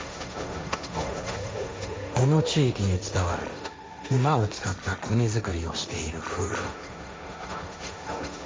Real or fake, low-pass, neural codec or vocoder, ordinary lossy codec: fake; none; codec, 16 kHz, 1.1 kbps, Voila-Tokenizer; none